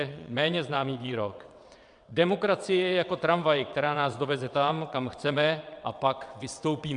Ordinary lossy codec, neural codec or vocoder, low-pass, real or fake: MP3, 96 kbps; vocoder, 22.05 kHz, 80 mel bands, WaveNeXt; 9.9 kHz; fake